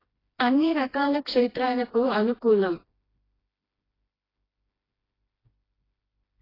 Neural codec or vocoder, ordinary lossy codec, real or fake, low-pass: codec, 16 kHz, 1 kbps, FreqCodec, smaller model; AAC, 24 kbps; fake; 5.4 kHz